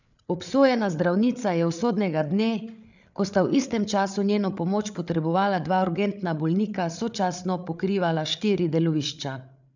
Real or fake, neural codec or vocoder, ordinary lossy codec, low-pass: fake; codec, 16 kHz, 8 kbps, FreqCodec, larger model; none; 7.2 kHz